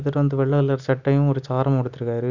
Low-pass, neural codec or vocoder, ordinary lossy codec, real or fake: 7.2 kHz; none; none; real